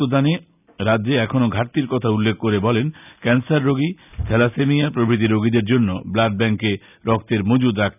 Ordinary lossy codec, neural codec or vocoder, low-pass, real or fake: none; none; 3.6 kHz; real